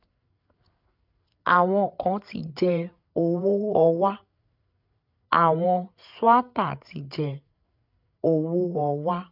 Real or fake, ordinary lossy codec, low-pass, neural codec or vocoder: fake; none; 5.4 kHz; codec, 16 kHz, 8 kbps, FreqCodec, larger model